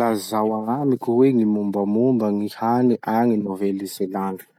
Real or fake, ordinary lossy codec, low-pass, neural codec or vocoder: real; none; 19.8 kHz; none